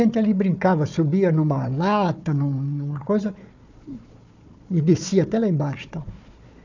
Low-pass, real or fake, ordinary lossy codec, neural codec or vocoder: 7.2 kHz; fake; none; codec, 16 kHz, 4 kbps, FunCodec, trained on Chinese and English, 50 frames a second